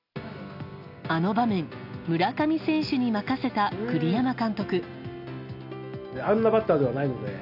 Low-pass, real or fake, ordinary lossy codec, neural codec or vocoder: 5.4 kHz; real; AAC, 48 kbps; none